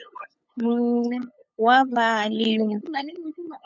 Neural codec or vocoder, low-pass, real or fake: codec, 16 kHz, 8 kbps, FunCodec, trained on LibriTTS, 25 frames a second; 7.2 kHz; fake